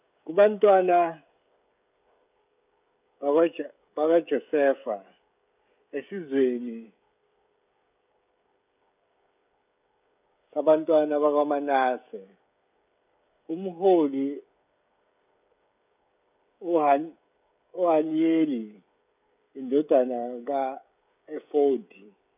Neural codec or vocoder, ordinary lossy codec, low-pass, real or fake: codec, 16 kHz, 16 kbps, FreqCodec, smaller model; none; 3.6 kHz; fake